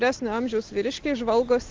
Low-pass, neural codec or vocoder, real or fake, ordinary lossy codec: 7.2 kHz; none; real; Opus, 16 kbps